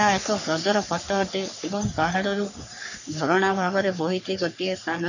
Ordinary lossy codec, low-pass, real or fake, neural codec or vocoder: none; 7.2 kHz; fake; codec, 44.1 kHz, 3.4 kbps, Pupu-Codec